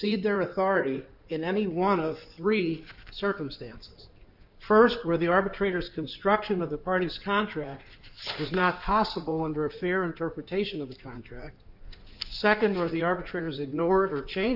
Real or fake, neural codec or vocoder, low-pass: fake; codec, 16 kHz in and 24 kHz out, 2.2 kbps, FireRedTTS-2 codec; 5.4 kHz